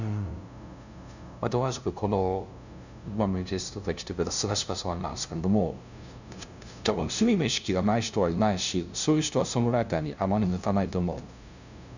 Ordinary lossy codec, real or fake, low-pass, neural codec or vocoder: none; fake; 7.2 kHz; codec, 16 kHz, 0.5 kbps, FunCodec, trained on LibriTTS, 25 frames a second